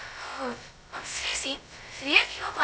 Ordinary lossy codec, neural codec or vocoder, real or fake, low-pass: none; codec, 16 kHz, 0.2 kbps, FocalCodec; fake; none